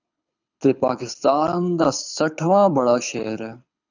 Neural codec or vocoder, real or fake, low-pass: codec, 24 kHz, 6 kbps, HILCodec; fake; 7.2 kHz